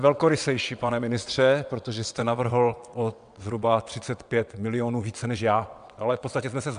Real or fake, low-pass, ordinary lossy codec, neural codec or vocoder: fake; 9.9 kHz; MP3, 96 kbps; vocoder, 22.05 kHz, 80 mel bands, WaveNeXt